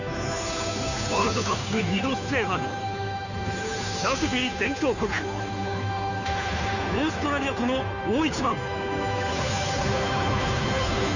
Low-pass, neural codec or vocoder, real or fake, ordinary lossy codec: 7.2 kHz; codec, 16 kHz, 2 kbps, FunCodec, trained on Chinese and English, 25 frames a second; fake; none